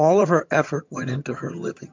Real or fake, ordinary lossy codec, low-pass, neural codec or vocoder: fake; MP3, 64 kbps; 7.2 kHz; vocoder, 22.05 kHz, 80 mel bands, HiFi-GAN